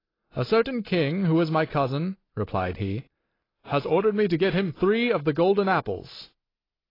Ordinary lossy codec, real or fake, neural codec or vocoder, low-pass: AAC, 24 kbps; real; none; 5.4 kHz